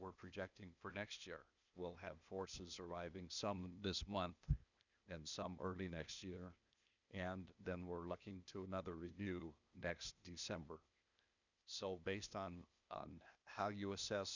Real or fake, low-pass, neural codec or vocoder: fake; 7.2 kHz; codec, 16 kHz, 0.8 kbps, ZipCodec